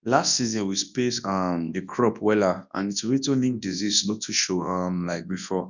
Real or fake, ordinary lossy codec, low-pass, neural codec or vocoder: fake; none; 7.2 kHz; codec, 24 kHz, 0.9 kbps, WavTokenizer, large speech release